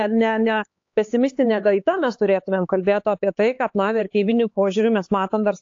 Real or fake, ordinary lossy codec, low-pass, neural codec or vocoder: fake; AAC, 48 kbps; 7.2 kHz; codec, 16 kHz, 4 kbps, X-Codec, HuBERT features, trained on LibriSpeech